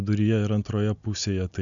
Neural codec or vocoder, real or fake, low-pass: none; real; 7.2 kHz